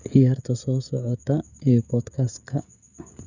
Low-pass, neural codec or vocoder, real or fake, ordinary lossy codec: 7.2 kHz; codec, 16 kHz, 16 kbps, FreqCodec, smaller model; fake; none